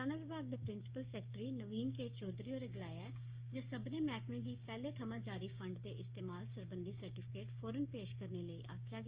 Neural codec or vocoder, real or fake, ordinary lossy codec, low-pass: codec, 16 kHz, 6 kbps, DAC; fake; Opus, 24 kbps; 3.6 kHz